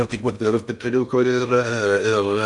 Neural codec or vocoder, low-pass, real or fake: codec, 16 kHz in and 24 kHz out, 0.6 kbps, FocalCodec, streaming, 4096 codes; 10.8 kHz; fake